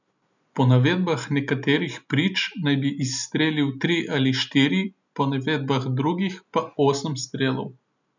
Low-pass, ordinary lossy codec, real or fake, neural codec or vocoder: 7.2 kHz; none; real; none